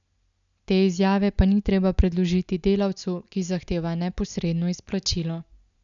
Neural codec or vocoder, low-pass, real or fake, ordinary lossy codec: none; 7.2 kHz; real; none